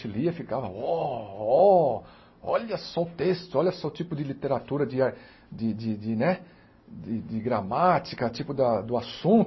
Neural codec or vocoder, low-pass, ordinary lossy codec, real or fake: none; 7.2 kHz; MP3, 24 kbps; real